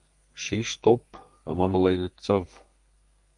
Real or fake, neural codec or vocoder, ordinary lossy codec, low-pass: fake; codec, 32 kHz, 1.9 kbps, SNAC; Opus, 32 kbps; 10.8 kHz